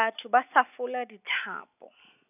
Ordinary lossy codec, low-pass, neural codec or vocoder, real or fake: none; 3.6 kHz; none; real